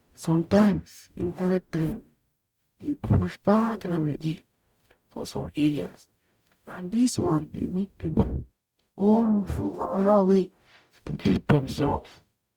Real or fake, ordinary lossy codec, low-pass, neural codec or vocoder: fake; Opus, 64 kbps; 19.8 kHz; codec, 44.1 kHz, 0.9 kbps, DAC